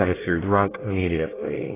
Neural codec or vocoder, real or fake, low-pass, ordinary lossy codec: codec, 24 kHz, 1 kbps, SNAC; fake; 3.6 kHz; AAC, 16 kbps